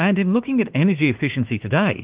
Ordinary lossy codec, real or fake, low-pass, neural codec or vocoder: Opus, 32 kbps; fake; 3.6 kHz; autoencoder, 48 kHz, 32 numbers a frame, DAC-VAE, trained on Japanese speech